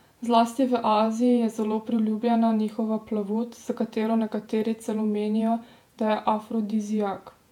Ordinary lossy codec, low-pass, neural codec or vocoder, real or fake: MP3, 96 kbps; 19.8 kHz; vocoder, 48 kHz, 128 mel bands, Vocos; fake